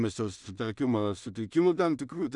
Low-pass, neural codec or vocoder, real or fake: 10.8 kHz; codec, 16 kHz in and 24 kHz out, 0.4 kbps, LongCat-Audio-Codec, two codebook decoder; fake